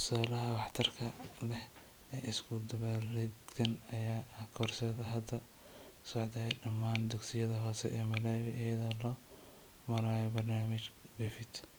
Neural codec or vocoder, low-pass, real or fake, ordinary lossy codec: vocoder, 44.1 kHz, 128 mel bands every 512 samples, BigVGAN v2; none; fake; none